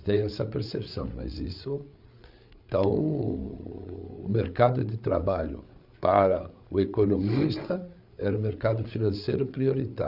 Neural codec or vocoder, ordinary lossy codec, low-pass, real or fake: codec, 16 kHz, 8 kbps, FreqCodec, larger model; none; 5.4 kHz; fake